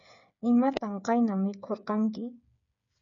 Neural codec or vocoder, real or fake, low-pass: codec, 16 kHz, 8 kbps, FreqCodec, smaller model; fake; 7.2 kHz